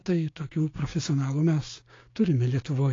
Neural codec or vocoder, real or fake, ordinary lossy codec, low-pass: codec, 16 kHz, 6 kbps, DAC; fake; AAC, 32 kbps; 7.2 kHz